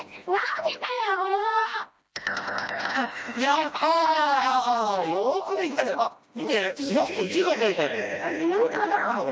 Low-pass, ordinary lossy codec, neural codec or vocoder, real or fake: none; none; codec, 16 kHz, 1 kbps, FreqCodec, smaller model; fake